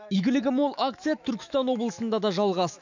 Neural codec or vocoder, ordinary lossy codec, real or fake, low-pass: autoencoder, 48 kHz, 128 numbers a frame, DAC-VAE, trained on Japanese speech; none; fake; 7.2 kHz